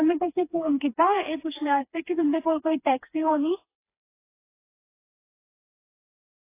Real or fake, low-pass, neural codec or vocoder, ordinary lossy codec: fake; 3.6 kHz; codec, 16 kHz, 2 kbps, FreqCodec, smaller model; AAC, 24 kbps